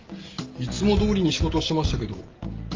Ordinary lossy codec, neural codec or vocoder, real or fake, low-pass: Opus, 32 kbps; none; real; 7.2 kHz